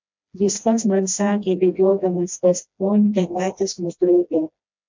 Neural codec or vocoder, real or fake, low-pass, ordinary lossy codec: codec, 16 kHz, 1 kbps, FreqCodec, smaller model; fake; 7.2 kHz; MP3, 64 kbps